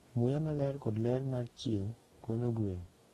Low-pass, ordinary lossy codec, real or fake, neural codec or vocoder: 19.8 kHz; AAC, 32 kbps; fake; codec, 44.1 kHz, 2.6 kbps, DAC